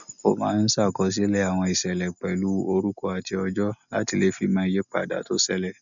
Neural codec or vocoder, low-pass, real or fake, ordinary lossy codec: none; 7.2 kHz; real; MP3, 96 kbps